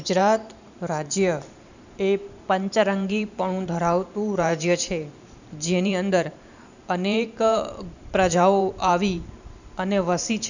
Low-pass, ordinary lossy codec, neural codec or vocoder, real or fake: 7.2 kHz; none; vocoder, 44.1 kHz, 128 mel bands every 512 samples, BigVGAN v2; fake